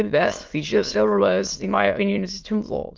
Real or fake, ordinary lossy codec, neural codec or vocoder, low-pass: fake; Opus, 32 kbps; autoencoder, 22.05 kHz, a latent of 192 numbers a frame, VITS, trained on many speakers; 7.2 kHz